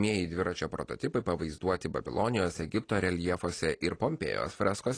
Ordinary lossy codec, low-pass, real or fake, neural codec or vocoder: AAC, 32 kbps; 9.9 kHz; real; none